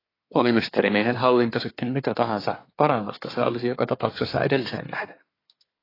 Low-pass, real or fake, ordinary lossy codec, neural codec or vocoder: 5.4 kHz; fake; AAC, 24 kbps; codec, 24 kHz, 1 kbps, SNAC